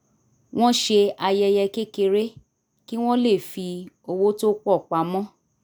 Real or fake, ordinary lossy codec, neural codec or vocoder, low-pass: real; none; none; none